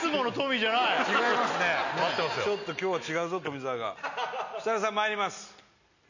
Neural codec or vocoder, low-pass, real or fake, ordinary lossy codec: none; 7.2 kHz; real; none